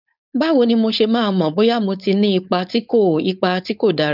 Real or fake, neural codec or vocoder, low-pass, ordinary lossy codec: fake; codec, 16 kHz, 4.8 kbps, FACodec; 5.4 kHz; none